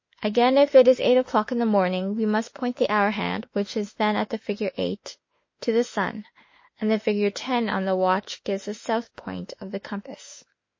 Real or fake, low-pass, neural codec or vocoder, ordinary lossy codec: fake; 7.2 kHz; autoencoder, 48 kHz, 32 numbers a frame, DAC-VAE, trained on Japanese speech; MP3, 32 kbps